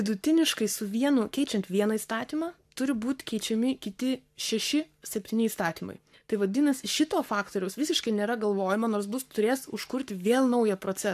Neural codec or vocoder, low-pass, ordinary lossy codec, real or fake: codec, 44.1 kHz, 7.8 kbps, Pupu-Codec; 14.4 kHz; AAC, 96 kbps; fake